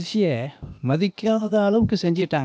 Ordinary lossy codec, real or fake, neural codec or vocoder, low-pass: none; fake; codec, 16 kHz, 0.8 kbps, ZipCodec; none